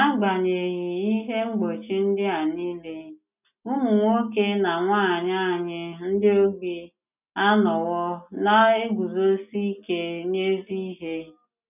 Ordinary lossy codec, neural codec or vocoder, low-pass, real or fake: none; none; 3.6 kHz; real